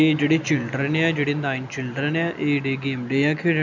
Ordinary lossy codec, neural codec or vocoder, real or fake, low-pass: none; none; real; 7.2 kHz